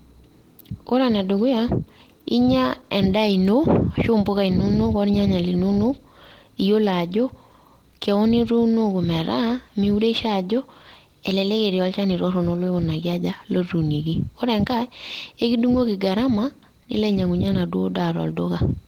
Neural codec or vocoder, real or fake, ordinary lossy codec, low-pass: none; real; Opus, 16 kbps; 19.8 kHz